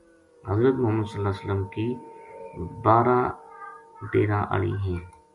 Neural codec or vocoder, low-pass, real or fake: none; 10.8 kHz; real